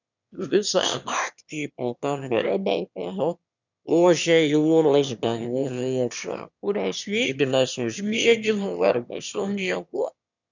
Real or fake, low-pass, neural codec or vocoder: fake; 7.2 kHz; autoencoder, 22.05 kHz, a latent of 192 numbers a frame, VITS, trained on one speaker